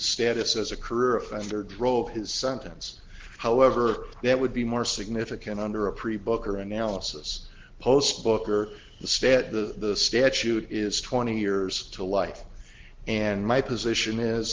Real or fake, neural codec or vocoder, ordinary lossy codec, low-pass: real; none; Opus, 16 kbps; 7.2 kHz